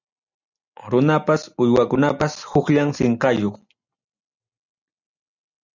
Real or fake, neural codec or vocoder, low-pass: real; none; 7.2 kHz